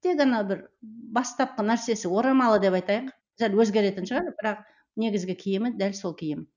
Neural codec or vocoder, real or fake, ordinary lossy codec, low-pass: none; real; none; 7.2 kHz